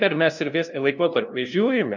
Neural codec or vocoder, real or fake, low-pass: codec, 16 kHz, 0.5 kbps, FunCodec, trained on LibriTTS, 25 frames a second; fake; 7.2 kHz